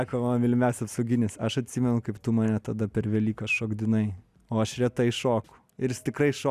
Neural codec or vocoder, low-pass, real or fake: none; 14.4 kHz; real